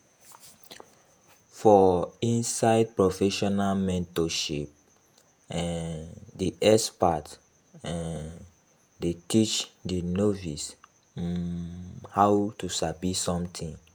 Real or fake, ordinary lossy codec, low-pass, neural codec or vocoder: fake; none; none; vocoder, 48 kHz, 128 mel bands, Vocos